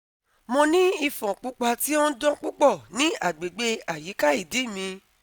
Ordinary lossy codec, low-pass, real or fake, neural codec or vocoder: none; none; real; none